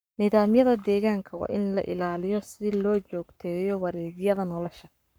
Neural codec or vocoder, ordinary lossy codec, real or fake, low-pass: codec, 44.1 kHz, 7.8 kbps, Pupu-Codec; none; fake; none